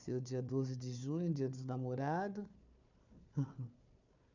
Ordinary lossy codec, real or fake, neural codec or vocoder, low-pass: none; fake; codec, 16 kHz, 4 kbps, FunCodec, trained on Chinese and English, 50 frames a second; 7.2 kHz